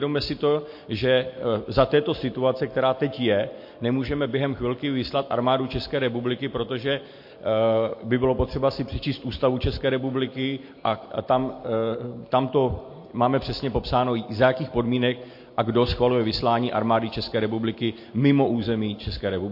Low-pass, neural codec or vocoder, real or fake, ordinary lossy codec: 5.4 kHz; none; real; MP3, 32 kbps